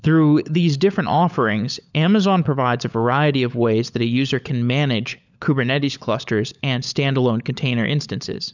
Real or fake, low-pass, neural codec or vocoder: fake; 7.2 kHz; codec, 16 kHz, 16 kbps, FunCodec, trained on LibriTTS, 50 frames a second